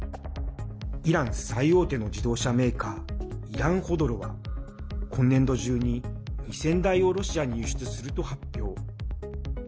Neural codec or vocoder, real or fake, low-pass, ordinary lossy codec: none; real; none; none